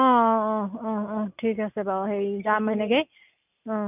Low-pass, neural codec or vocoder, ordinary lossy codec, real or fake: 3.6 kHz; none; none; real